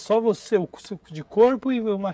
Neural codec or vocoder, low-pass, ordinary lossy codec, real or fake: codec, 16 kHz, 16 kbps, FreqCodec, smaller model; none; none; fake